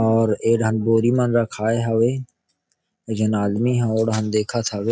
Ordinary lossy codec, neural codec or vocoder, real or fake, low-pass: none; none; real; none